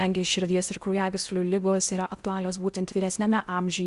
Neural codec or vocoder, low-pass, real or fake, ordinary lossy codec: codec, 16 kHz in and 24 kHz out, 0.8 kbps, FocalCodec, streaming, 65536 codes; 10.8 kHz; fake; Opus, 64 kbps